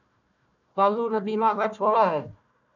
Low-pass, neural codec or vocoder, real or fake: 7.2 kHz; codec, 16 kHz, 1 kbps, FunCodec, trained on Chinese and English, 50 frames a second; fake